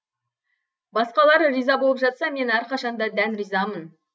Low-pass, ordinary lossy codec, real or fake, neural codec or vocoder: none; none; real; none